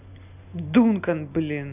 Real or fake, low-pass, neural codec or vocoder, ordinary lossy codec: real; 3.6 kHz; none; none